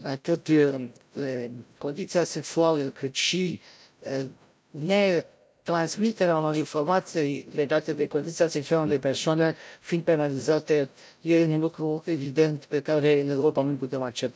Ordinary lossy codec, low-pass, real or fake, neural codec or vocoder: none; none; fake; codec, 16 kHz, 0.5 kbps, FreqCodec, larger model